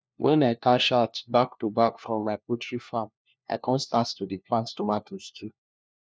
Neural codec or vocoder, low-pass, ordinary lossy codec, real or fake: codec, 16 kHz, 1 kbps, FunCodec, trained on LibriTTS, 50 frames a second; none; none; fake